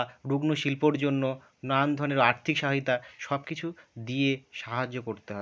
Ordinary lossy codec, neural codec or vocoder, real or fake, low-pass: none; none; real; none